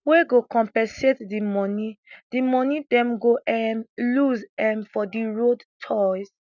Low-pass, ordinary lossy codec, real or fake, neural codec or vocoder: 7.2 kHz; none; real; none